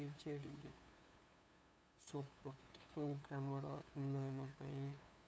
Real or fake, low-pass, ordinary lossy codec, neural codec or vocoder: fake; none; none; codec, 16 kHz, 2 kbps, FunCodec, trained on LibriTTS, 25 frames a second